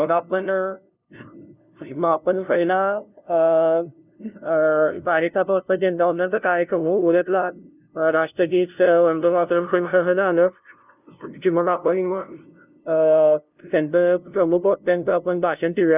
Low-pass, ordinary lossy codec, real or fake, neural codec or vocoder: 3.6 kHz; none; fake; codec, 16 kHz, 0.5 kbps, FunCodec, trained on LibriTTS, 25 frames a second